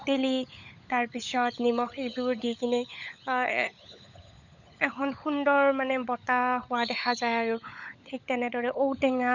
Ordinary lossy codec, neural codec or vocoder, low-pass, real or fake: none; codec, 16 kHz, 16 kbps, FunCodec, trained on LibriTTS, 50 frames a second; 7.2 kHz; fake